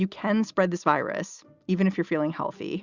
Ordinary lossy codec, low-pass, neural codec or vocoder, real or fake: Opus, 64 kbps; 7.2 kHz; none; real